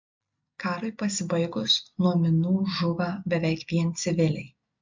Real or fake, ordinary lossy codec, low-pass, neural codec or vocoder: real; MP3, 64 kbps; 7.2 kHz; none